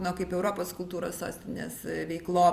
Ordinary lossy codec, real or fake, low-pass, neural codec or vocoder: Opus, 64 kbps; fake; 14.4 kHz; vocoder, 44.1 kHz, 128 mel bands every 256 samples, BigVGAN v2